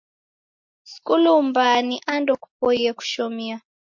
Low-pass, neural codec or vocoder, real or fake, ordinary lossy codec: 7.2 kHz; none; real; MP3, 48 kbps